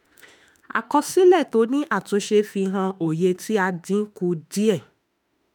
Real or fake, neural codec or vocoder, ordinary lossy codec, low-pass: fake; autoencoder, 48 kHz, 32 numbers a frame, DAC-VAE, trained on Japanese speech; none; none